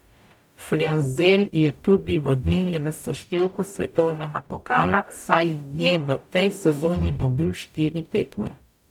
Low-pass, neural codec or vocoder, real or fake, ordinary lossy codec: 19.8 kHz; codec, 44.1 kHz, 0.9 kbps, DAC; fake; none